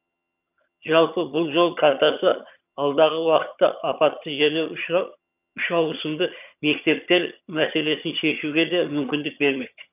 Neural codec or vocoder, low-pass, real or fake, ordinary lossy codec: vocoder, 22.05 kHz, 80 mel bands, HiFi-GAN; 3.6 kHz; fake; none